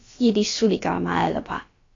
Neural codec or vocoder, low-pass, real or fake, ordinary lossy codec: codec, 16 kHz, about 1 kbps, DyCAST, with the encoder's durations; 7.2 kHz; fake; AAC, 32 kbps